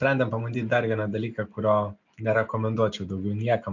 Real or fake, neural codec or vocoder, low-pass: real; none; 7.2 kHz